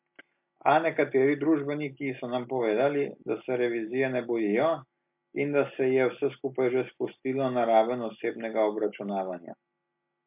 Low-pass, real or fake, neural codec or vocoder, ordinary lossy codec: 3.6 kHz; real; none; MP3, 32 kbps